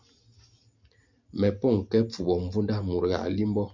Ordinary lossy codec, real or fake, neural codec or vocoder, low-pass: MP3, 64 kbps; real; none; 7.2 kHz